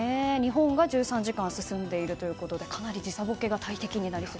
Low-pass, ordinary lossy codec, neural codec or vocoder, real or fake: none; none; none; real